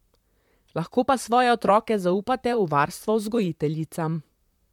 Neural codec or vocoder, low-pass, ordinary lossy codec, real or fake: vocoder, 44.1 kHz, 128 mel bands, Pupu-Vocoder; 19.8 kHz; MP3, 96 kbps; fake